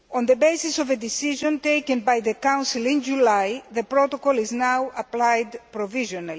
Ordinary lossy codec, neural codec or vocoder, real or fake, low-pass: none; none; real; none